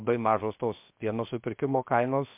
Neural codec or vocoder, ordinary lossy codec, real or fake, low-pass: codec, 16 kHz, 0.7 kbps, FocalCodec; MP3, 32 kbps; fake; 3.6 kHz